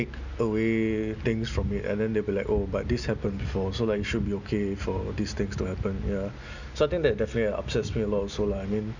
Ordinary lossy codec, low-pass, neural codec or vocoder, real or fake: none; 7.2 kHz; none; real